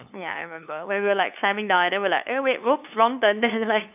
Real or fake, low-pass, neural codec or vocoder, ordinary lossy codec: fake; 3.6 kHz; codec, 16 kHz, 2 kbps, FunCodec, trained on LibriTTS, 25 frames a second; none